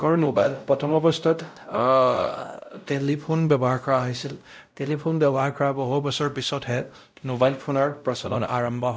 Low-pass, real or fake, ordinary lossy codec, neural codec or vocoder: none; fake; none; codec, 16 kHz, 0.5 kbps, X-Codec, WavLM features, trained on Multilingual LibriSpeech